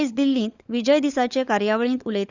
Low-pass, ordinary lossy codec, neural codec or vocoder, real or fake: 7.2 kHz; Opus, 64 kbps; codec, 16 kHz, 16 kbps, FunCodec, trained on LibriTTS, 50 frames a second; fake